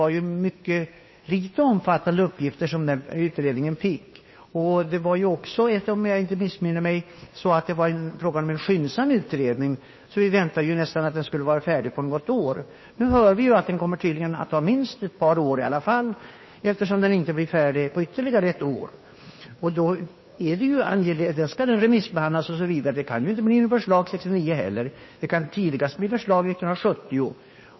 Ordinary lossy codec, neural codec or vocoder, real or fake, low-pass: MP3, 24 kbps; codec, 16 kHz, 2 kbps, FunCodec, trained on Chinese and English, 25 frames a second; fake; 7.2 kHz